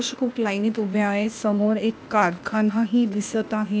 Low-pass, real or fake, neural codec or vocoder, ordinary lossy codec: none; fake; codec, 16 kHz, 0.8 kbps, ZipCodec; none